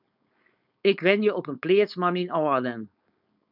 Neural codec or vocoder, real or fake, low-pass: codec, 16 kHz, 4.8 kbps, FACodec; fake; 5.4 kHz